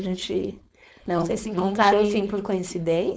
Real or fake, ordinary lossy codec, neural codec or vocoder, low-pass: fake; none; codec, 16 kHz, 4.8 kbps, FACodec; none